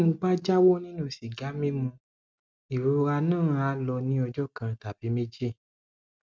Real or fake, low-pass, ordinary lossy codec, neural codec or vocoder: real; none; none; none